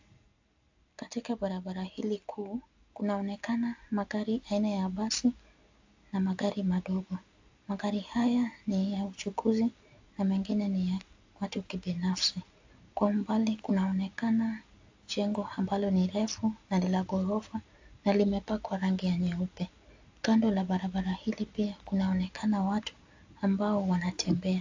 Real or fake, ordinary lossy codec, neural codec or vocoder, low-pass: real; AAC, 48 kbps; none; 7.2 kHz